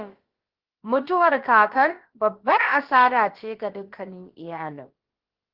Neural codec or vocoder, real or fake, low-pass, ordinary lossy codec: codec, 16 kHz, about 1 kbps, DyCAST, with the encoder's durations; fake; 5.4 kHz; Opus, 16 kbps